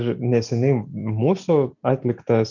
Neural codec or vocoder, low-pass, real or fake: none; 7.2 kHz; real